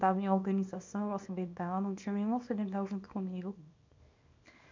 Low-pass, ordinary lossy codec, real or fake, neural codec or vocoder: 7.2 kHz; MP3, 64 kbps; fake; codec, 24 kHz, 0.9 kbps, WavTokenizer, small release